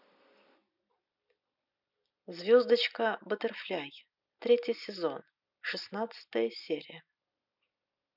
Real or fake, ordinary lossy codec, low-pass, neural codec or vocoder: real; none; 5.4 kHz; none